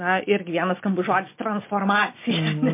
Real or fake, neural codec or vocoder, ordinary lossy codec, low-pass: real; none; MP3, 24 kbps; 3.6 kHz